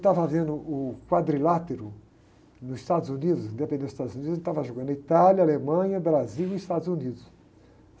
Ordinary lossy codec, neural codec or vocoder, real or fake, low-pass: none; none; real; none